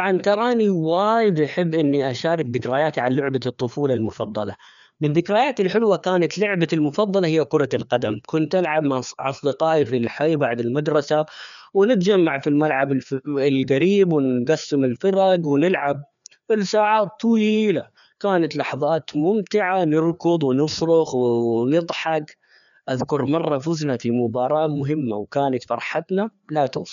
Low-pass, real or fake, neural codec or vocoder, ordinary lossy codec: 7.2 kHz; fake; codec, 16 kHz, 2 kbps, FreqCodec, larger model; none